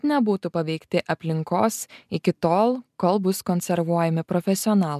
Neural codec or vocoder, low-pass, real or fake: none; 14.4 kHz; real